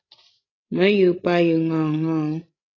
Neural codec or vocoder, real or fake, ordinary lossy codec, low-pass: codec, 16 kHz, 16 kbps, FreqCodec, larger model; fake; AAC, 32 kbps; 7.2 kHz